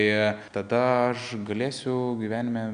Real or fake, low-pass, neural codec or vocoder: real; 9.9 kHz; none